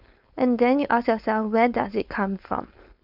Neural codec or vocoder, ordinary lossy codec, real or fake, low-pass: codec, 16 kHz, 4.8 kbps, FACodec; none; fake; 5.4 kHz